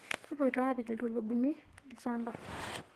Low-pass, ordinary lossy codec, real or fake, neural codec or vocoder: 14.4 kHz; Opus, 16 kbps; fake; codec, 32 kHz, 1.9 kbps, SNAC